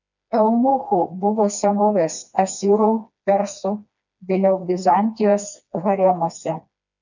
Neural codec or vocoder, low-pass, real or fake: codec, 16 kHz, 2 kbps, FreqCodec, smaller model; 7.2 kHz; fake